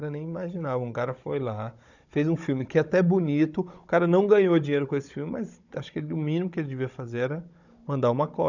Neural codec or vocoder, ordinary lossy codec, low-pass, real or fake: codec, 16 kHz, 16 kbps, FunCodec, trained on Chinese and English, 50 frames a second; none; 7.2 kHz; fake